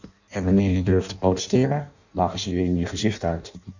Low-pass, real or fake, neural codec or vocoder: 7.2 kHz; fake; codec, 16 kHz in and 24 kHz out, 0.6 kbps, FireRedTTS-2 codec